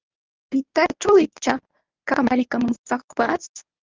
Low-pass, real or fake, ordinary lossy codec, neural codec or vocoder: 7.2 kHz; fake; Opus, 24 kbps; codec, 24 kHz, 0.9 kbps, WavTokenizer, medium speech release version 1